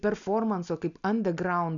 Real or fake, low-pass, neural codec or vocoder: real; 7.2 kHz; none